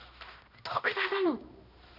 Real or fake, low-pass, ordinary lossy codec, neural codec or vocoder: fake; 5.4 kHz; none; codec, 16 kHz, 1 kbps, X-Codec, HuBERT features, trained on balanced general audio